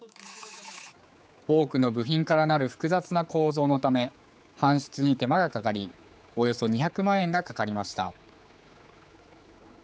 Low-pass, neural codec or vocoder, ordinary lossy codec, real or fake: none; codec, 16 kHz, 4 kbps, X-Codec, HuBERT features, trained on general audio; none; fake